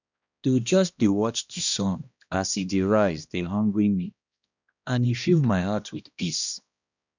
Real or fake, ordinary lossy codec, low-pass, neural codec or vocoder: fake; none; 7.2 kHz; codec, 16 kHz, 1 kbps, X-Codec, HuBERT features, trained on balanced general audio